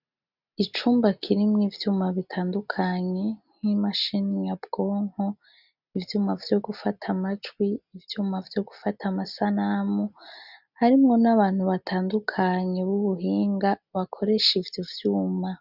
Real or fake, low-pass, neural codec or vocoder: real; 5.4 kHz; none